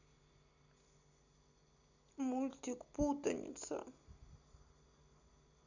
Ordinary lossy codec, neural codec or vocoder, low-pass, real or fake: none; none; 7.2 kHz; real